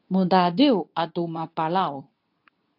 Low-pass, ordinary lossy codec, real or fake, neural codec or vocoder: 5.4 kHz; AAC, 32 kbps; fake; codec, 24 kHz, 0.9 kbps, WavTokenizer, medium speech release version 2